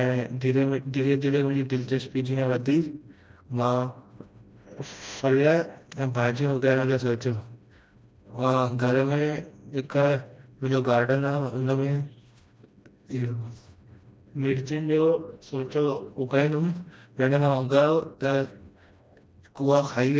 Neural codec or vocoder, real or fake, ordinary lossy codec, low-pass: codec, 16 kHz, 1 kbps, FreqCodec, smaller model; fake; none; none